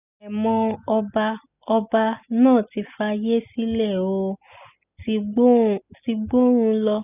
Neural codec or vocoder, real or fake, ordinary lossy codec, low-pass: none; real; none; 3.6 kHz